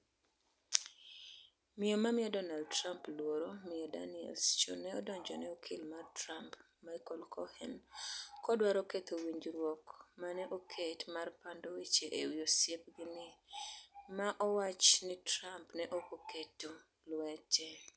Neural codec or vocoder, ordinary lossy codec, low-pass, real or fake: none; none; none; real